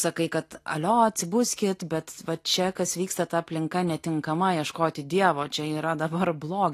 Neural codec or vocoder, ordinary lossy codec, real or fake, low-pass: none; AAC, 48 kbps; real; 14.4 kHz